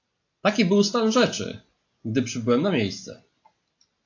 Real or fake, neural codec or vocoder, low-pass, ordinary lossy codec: real; none; 7.2 kHz; AAC, 48 kbps